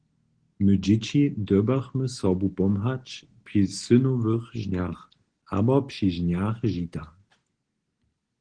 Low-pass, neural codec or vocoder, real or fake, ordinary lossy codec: 9.9 kHz; none; real; Opus, 16 kbps